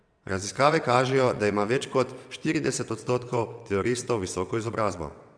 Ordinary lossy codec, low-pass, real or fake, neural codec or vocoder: none; 9.9 kHz; fake; vocoder, 22.05 kHz, 80 mel bands, WaveNeXt